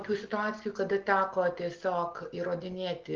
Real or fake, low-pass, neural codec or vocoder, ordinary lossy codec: real; 7.2 kHz; none; Opus, 16 kbps